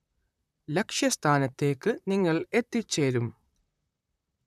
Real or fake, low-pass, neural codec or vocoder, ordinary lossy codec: fake; 14.4 kHz; vocoder, 44.1 kHz, 128 mel bands, Pupu-Vocoder; none